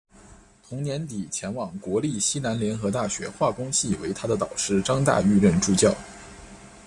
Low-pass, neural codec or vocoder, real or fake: 10.8 kHz; none; real